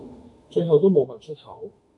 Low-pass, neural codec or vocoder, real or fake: 10.8 kHz; autoencoder, 48 kHz, 32 numbers a frame, DAC-VAE, trained on Japanese speech; fake